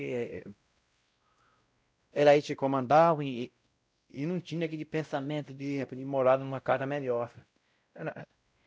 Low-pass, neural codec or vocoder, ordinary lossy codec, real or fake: none; codec, 16 kHz, 0.5 kbps, X-Codec, WavLM features, trained on Multilingual LibriSpeech; none; fake